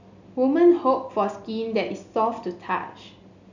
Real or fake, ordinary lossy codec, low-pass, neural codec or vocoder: real; none; 7.2 kHz; none